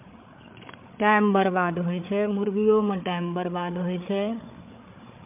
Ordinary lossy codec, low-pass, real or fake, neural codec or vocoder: MP3, 32 kbps; 3.6 kHz; fake; codec, 16 kHz, 8 kbps, FreqCodec, larger model